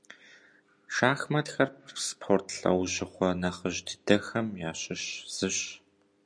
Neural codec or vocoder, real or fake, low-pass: none; real; 9.9 kHz